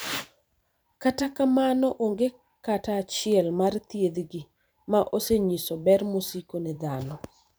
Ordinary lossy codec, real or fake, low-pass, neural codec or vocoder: none; fake; none; vocoder, 44.1 kHz, 128 mel bands every 512 samples, BigVGAN v2